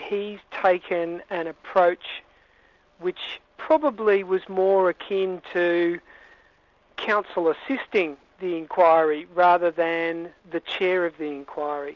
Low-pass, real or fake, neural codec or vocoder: 7.2 kHz; real; none